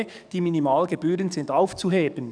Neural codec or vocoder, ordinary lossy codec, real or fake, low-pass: codec, 44.1 kHz, 7.8 kbps, DAC; none; fake; 9.9 kHz